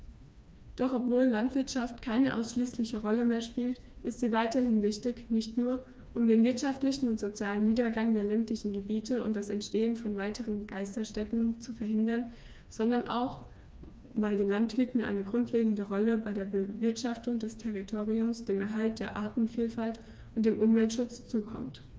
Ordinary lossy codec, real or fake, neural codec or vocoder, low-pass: none; fake; codec, 16 kHz, 2 kbps, FreqCodec, smaller model; none